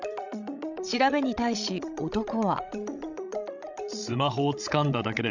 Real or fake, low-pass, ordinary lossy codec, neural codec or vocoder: fake; 7.2 kHz; none; codec, 16 kHz, 16 kbps, FreqCodec, larger model